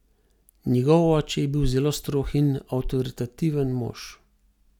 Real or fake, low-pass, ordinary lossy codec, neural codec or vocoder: real; 19.8 kHz; none; none